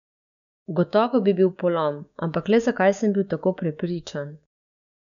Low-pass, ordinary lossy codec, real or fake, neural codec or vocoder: 7.2 kHz; none; fake; codec, 16 kHz, 6 kbps, DAC